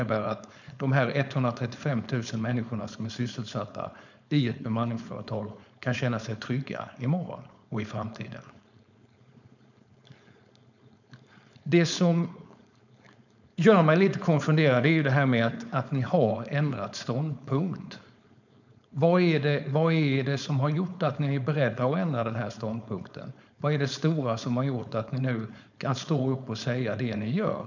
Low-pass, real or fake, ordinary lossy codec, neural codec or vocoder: 7.2 kHz; fake; none; codec, 16 kHz, 4.8 kbps, FACodec